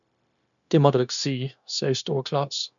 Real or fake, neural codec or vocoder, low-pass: fake; codec, 16 kHz, 0.9 kbps, LongCat-Audio-Codec; 7.2 kHz